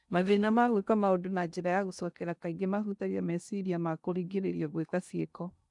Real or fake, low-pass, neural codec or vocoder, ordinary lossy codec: fake; 10.8 kHz; codec, 16 kHz in and 24 kHz out, 0.8 kbps, FocalCodec, streaming, 65536 codes; none